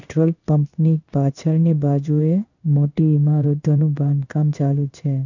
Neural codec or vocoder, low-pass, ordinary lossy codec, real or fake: codec, 16 kHz in and 24 kHz out, 1 kbps, XY-Tokenizer; 7.2 kHz; none; fake